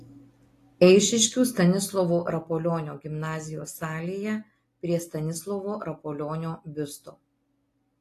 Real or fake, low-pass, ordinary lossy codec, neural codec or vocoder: real; 14.4 kHz; AAC, 48 kbps; none